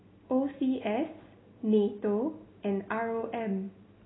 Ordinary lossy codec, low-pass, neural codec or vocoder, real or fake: AAC, 16 kbps; 7.2 kHz; none; real